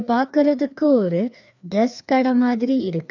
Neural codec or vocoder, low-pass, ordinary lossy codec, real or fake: codec, 16 kHz, 2 kbps, FreqCodec, larger model; 7.2 kHz; none; fake